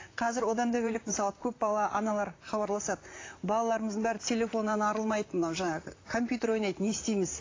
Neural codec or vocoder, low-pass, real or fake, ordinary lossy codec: vocoder, 44.1 kHz, 128 mel bands, Pupu-Vocoder; 7.2 kHz; fake; AAC, 32 kbps